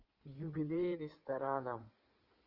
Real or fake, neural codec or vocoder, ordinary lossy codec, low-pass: fake; codec, 16 kHz in and 24 kHz out, 2.2 kbps, FireRedTTS-2 codec; Opus, 24 kbps; 5.4 kHz